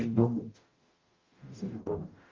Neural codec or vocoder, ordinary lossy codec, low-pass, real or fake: codec, 44.1 kHz, 0.9 kbps, DAC; Opus, 32 kbps; 7.2 kHz; fake